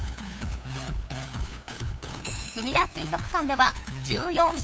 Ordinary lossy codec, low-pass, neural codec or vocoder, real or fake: none; none; codec, 16 kHz, 2 kbps, FunCodec, trained on LibriTTS, 25 frames a second; fake